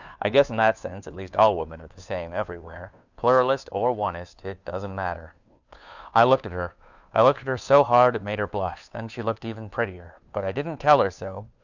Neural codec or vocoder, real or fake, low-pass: codec, 16 kHz, 2 kbps, FunCodec, trained on Chinese and English, 25 frames a second; fake; 7.2 kHz